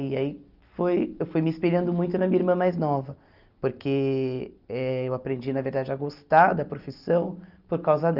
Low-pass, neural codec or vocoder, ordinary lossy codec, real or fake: 5.4 kHz; none; Opus, 24 kbps; real